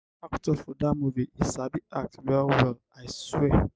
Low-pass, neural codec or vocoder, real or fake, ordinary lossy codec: none; none; real; none